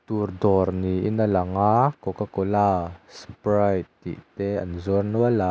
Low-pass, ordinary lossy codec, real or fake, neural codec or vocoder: none; none; real; none